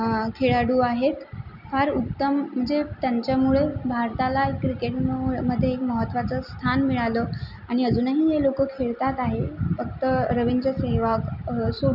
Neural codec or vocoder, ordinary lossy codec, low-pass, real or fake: none; none; 5.4 kHz; real